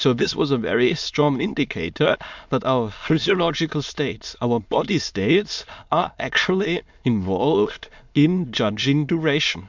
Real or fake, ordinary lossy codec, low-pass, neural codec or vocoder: fake; MP3, 64 kbps; 7.2 kHz; autoencoder, 22.05 kHz, a latent of 192 numbers a frame, VITS, trained on many speakers